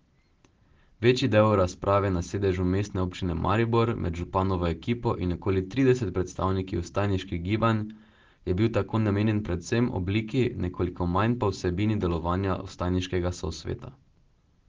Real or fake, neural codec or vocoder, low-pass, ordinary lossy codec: real; none; 7.2 kHz; Opus, 16 kbps